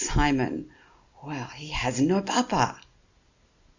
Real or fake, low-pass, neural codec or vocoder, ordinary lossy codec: real; 7.2 kHz; none; Opus, 64 kbps